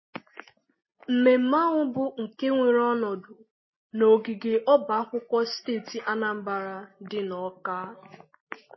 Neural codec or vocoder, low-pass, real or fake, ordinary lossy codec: none; 7.2 kHz; real; MP3, 24 kbps